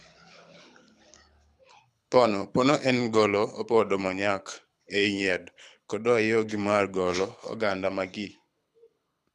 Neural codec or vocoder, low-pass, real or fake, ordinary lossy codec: codec, 24 kHz, 6 kbps, HILCodec; none; fake; none